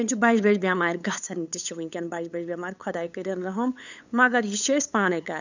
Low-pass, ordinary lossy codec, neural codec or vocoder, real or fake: 7.2 kHz; none; codec, 16 kHz, 8 kbps, FunCodec, trained on LibriTTS, 25 frames a second; fake